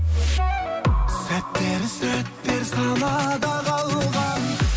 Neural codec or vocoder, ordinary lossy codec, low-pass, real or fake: none; none; none; real